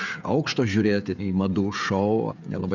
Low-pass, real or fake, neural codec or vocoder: 7.2 kHz; fake; codec, 16 kHz, 16 kbps, FreqCodec, smaller model